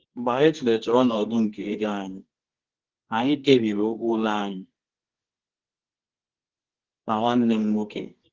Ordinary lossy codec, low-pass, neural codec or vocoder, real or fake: Opus, 16 kbps; 7.2 kHz; codec, 24 kHz, 0.9 kbps, WavTokenizer, medium music audio release; fake